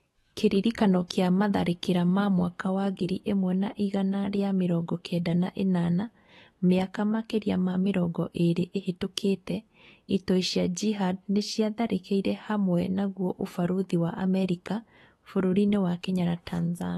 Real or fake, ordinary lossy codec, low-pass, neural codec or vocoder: fake; AAC, 32 kbps; 19.8 kHz; autoencoder, 48 kHz, 128 numbers a frame, DAC-VAE, trained on Japanese speech